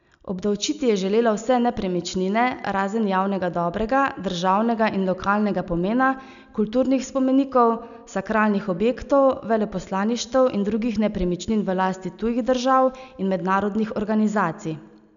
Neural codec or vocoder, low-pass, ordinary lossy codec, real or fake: none; 7.2 kHz; none; real